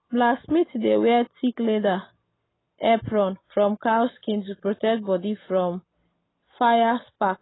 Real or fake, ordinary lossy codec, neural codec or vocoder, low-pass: real; AAC, 16 kbps; none; 7.2 kHz